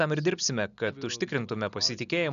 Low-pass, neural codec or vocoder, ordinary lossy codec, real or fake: 7.2 kHz; none; MP3, 96 kbps; real